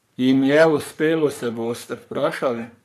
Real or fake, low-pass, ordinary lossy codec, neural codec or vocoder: fake; 14.4 kHz; none; codec, 44.1 kHz, 3.4 kbps, Pupu-Codec